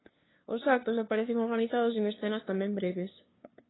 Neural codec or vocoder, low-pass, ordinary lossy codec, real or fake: codec, 16 kHz, 2 kbps, FunCodec, trained on LibriTTS, 25 frames a second; 7.2 kHz; AAC, 16 kbps; fake